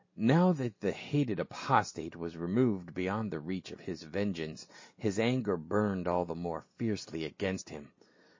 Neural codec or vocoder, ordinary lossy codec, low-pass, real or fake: none; MP3, 32 kbps; 7.2 kHz; real